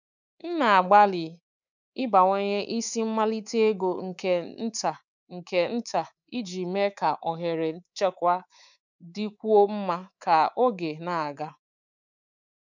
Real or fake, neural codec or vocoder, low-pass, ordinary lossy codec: fake; codec, 24 kHz, 3.1 kbps, DualCodec; 7.2 kHz; none